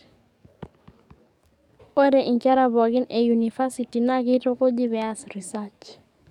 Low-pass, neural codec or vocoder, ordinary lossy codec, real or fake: 19.8 kHz; codec, 44.1 kHz, 7.8 kbps, DAC; none; fake